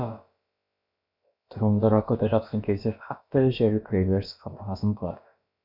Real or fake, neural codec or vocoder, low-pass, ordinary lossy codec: fake; codec, 16 kHz, about 1 kbps, DyCAST, with the encoder's durations; 5.4 kHz; none